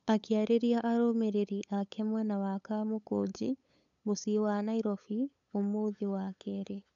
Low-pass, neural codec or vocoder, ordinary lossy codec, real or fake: 7.2 kHz; codec, 16 kHz, 8 kbps, FunCodec, trained on LibriTTS, 25 frames a second; none; fake